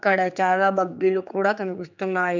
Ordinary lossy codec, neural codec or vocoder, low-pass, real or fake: none; codec, 16 kHz, 4 kbps, X-Codec, HuBERT features, trained on general audio; 7.2 kHz; fake